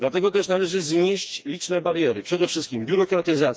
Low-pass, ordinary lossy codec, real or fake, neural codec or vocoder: none; none; fake; codec, 16 kHz, 2 kbps, FreqCodec, smaller model